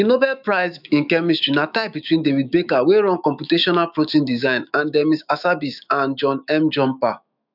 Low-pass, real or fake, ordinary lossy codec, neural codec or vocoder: 5.4 kHz; fake; none; autoencoder, 48 kHz, 128 numbers a frame, DAC-VAE, trained on Japanese speech